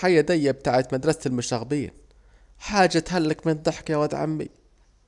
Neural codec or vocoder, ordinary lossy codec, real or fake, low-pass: none; none; real; 10.8 kHz